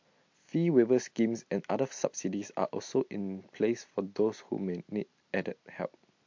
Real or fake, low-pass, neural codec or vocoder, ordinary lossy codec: real; 7.2 kHz; none; MP3, 48 kbps